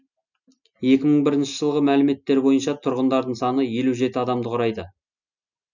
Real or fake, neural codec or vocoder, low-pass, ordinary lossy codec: real; none; 7.2 kHz; none